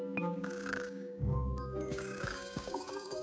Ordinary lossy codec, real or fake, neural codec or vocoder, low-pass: none; fake; codec, 16 kHz, 6 kbps, DAC; none